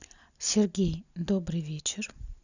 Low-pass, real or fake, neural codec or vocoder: 7.2 kHz; real; none